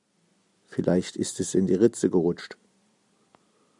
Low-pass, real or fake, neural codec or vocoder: 10.8 kHz; real; none